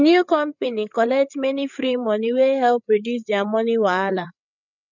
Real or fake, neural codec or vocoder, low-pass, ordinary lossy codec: fake; codec, 16 kHz, 8 kbps, FreqCodec, larger model; 7.2 kHz; none